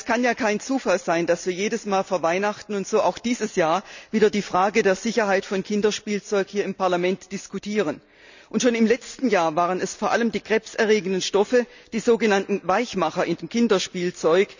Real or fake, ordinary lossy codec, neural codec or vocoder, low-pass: real; none; none; 7.2 kHz